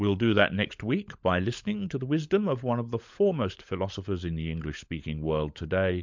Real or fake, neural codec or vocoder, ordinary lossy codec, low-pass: fake; codec, 16 kHz, 16 kbps, FunCodec, trained on LibriTTS, 50 frames a second; MP3, 64 kbps; 7.2 kHz